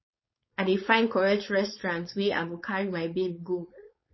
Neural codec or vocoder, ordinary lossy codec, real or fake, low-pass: codec, 16 kHz, 4.8 kbps, FACodec; MP3, 24 kbps; fake; 7.2 kHz